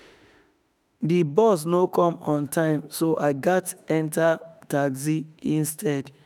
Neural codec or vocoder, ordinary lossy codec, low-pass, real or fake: autoencoder, 48 kHz, 32 numbers a frame, DAC-VAE, trained on Japanese speech; none; none; fake